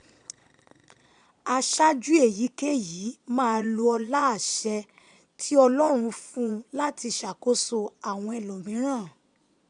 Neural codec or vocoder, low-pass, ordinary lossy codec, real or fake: vocoder, 22.05 kHz, 80 mel bands, Vocos; 9.9 kHz; none; fake